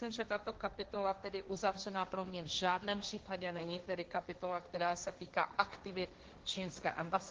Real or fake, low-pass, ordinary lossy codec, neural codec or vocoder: fake; 7.2 kHz; Opus, 16 kbps; codec, 16 kHz, 1.1 kbps, Voila-Tokenizer